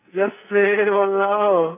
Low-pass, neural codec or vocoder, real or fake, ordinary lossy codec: 3.6 kHz; codec, 44.1 kHz, 2.6 kbps, SNAC; fake; none